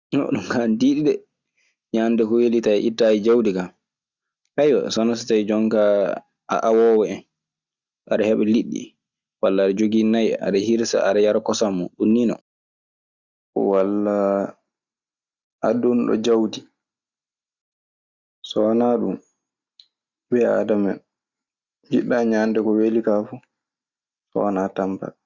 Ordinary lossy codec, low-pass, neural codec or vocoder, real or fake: Opus, 64 kbps; 7.2 kHz; none; real